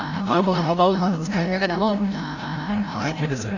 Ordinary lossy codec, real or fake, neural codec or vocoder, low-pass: none; fake; codec, 16 kHz, 0.5 kbps, FreqCodec, larger model; 7.2 kHz